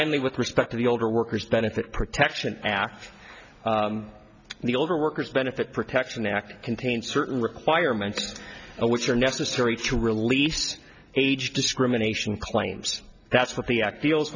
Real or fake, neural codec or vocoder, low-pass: real; none; 7.2 kHz